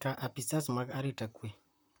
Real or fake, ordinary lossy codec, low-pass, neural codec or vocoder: fake; none; none; vocoder, 44.1 kHz, 128 mel bands, Pupu-Vocoder